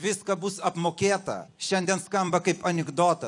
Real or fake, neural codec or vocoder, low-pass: fake; vocoder, 44.1 kHz, 128 mel bands every 256 samples, BigVGAN v2; 10.8 kHz